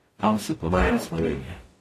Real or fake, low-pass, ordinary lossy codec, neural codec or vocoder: fake; 14.4 kHz; AAC, 48 kbps; codec, 44.1 kHz, 0.9 kbps, DAC